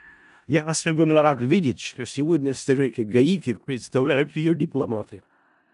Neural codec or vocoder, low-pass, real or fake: codec, 16 kHz in and 24 kHz out, 0.4 kbps, LongCat-Audio-Codec, four codebook decoder; 10.8 kHz; fake